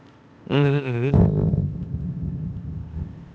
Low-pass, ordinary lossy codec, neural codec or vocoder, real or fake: none; none; codec, 16 kHz, 0.8 kbps, ZipCodec; fake